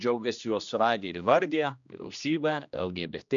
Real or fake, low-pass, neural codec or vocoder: fake; 7.2 kHz; codec, 16 kHz, 1 kbps, X-Codec, HuBERT features, trained on general audio